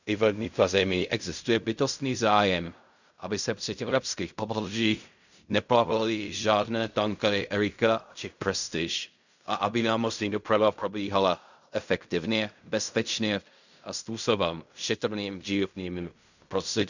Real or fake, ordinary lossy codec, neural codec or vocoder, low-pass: fake; none; codec, 16 kHz in and 24 kHz out, 0.4 kbps, LongCat-Audio-Codec, fine tuned four codebook decoder; 7.2 kHz